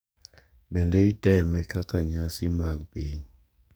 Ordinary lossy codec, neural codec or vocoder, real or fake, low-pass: none; codec, 44.1 kHz, 2.6 kbps, SNAC; fake; none